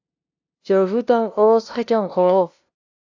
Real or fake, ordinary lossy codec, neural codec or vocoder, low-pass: fake; AAC, 48 kbps; codec, 16 kHz, 0.5 kbps, FunCodec, trained on LibriTTS, 25 frames a second; 7.2 kHz